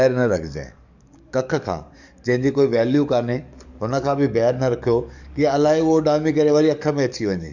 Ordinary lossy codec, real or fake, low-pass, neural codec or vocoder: none; fake; 7.2 kHz; codec, 44.1 kHz, 7.8 kbps, DAC